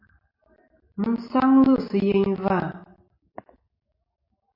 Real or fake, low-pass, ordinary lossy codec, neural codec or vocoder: real; 5.4 kHz; MP3, 32 kbps; none